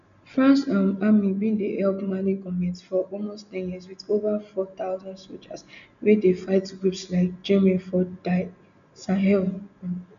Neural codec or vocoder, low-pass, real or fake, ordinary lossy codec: none; 7.2 kHz; real; none